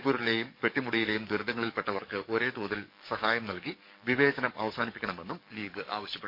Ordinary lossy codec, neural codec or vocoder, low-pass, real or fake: MP3, 32 kbps; codec, 44.1 kHz, 7.8 kbps, DAC; 5.4 kHz; fake